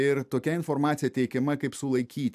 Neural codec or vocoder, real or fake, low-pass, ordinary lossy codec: none; real; 14.4 kHz; AAC, 96 kbps